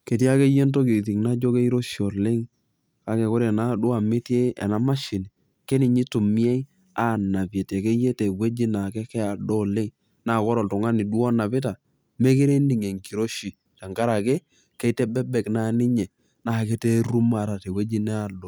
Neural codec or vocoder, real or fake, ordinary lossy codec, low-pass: none; real; none; none